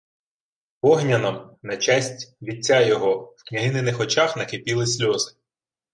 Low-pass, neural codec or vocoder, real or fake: 9.9 kHz; none; real